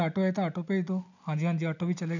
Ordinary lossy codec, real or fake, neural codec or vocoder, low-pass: none; real; none; 7.2 kHz